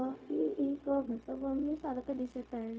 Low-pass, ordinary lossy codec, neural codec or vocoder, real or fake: none; none; codec, 16 kHz, 0.4 kbps, LongCat-Audio-Codec; fake